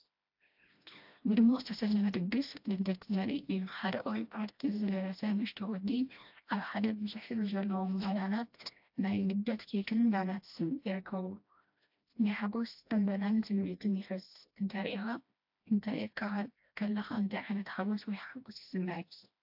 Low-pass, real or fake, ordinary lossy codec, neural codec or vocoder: 5.4 kHz; fake; AAC, 48 kbps; codec, 16 kHz, 1 kbps, FreqCodec, smaller model